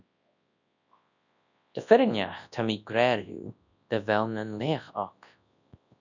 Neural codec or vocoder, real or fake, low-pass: codec, 24 kHz, 0.9 kbps, WavTokenizer, large speech release; fake; 7.2 kHz